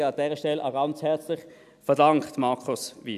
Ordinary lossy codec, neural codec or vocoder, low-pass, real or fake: none; none; 14.4 kHz; real